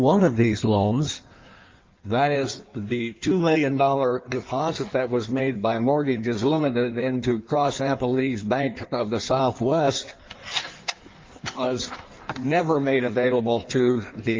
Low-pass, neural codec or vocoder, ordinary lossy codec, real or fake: 7.2 kHz; codec, 16 kHz in and 24 kHz out, 1.1 kbps, FireRedTTS-2 codec; Opus, 32 kbps; fake